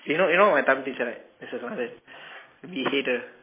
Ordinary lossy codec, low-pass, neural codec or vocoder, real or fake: MP3, 16 kbps; 3.6 kHz; none; real